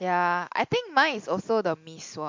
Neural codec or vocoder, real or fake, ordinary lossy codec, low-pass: none; real; MP3, 48 kbps; 7.2 kHz